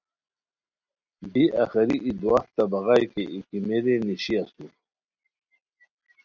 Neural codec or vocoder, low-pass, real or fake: none; 7.2 kHz; real